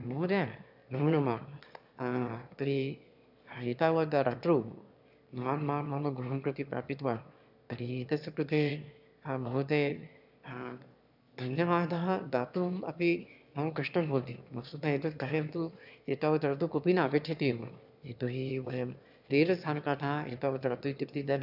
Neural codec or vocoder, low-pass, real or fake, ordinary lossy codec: autoencoder, 22.05 kHz, a latent of 192 numbers a frame, VITS, trained on one speaker; 5.4 kHz; fake; none